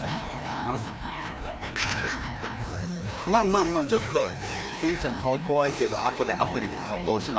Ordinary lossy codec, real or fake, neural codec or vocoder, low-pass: none; fake; codec, 16 kHz, 1 kbps, FreqCodec, larger model; none